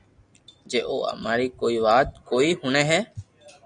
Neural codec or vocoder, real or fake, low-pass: none; real; 9.9 kHz